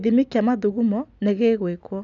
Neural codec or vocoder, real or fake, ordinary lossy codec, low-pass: none; real; none; 7.2 kHz